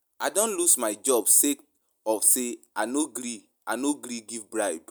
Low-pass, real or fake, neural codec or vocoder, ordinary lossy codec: none; real; none; none